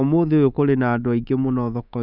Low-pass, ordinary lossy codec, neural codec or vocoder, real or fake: 5.4 kHz; none; codec, 24 kHz, 3.1 kbps, DualCodec; fake